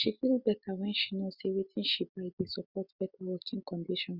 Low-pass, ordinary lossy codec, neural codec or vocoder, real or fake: 5.4 kHz; Opus, 64 kbps; none; real